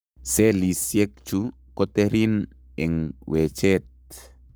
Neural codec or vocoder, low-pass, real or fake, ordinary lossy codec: codec, 44.1 kHz, 7.8 kbps, Pupu-Codec; none; fake; none